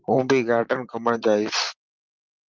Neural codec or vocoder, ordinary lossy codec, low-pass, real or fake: vocoder, 44.1 kHz, 128 mel bands, Pupu-Vocoder; Opus, 24 kbps; 7.2 kHz; fake